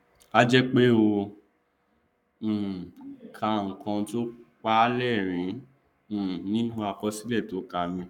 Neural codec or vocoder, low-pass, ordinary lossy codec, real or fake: codec, 44.1 kHz, 7.8 kbps, Pupu-Codec; 19.8 kHz; none; fake